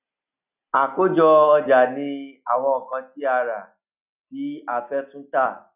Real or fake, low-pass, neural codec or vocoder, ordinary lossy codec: real; 3.6 kHz; none; none